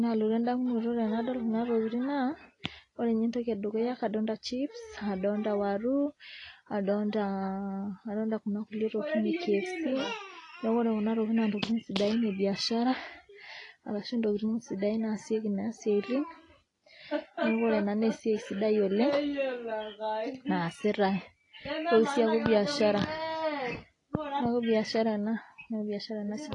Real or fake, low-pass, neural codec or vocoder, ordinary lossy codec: real; 10.8 kHz; none; AAC, 32 kbps